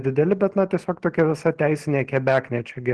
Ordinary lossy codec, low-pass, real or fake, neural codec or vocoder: Opus, 16 kbps; 10.8 kHz; real; none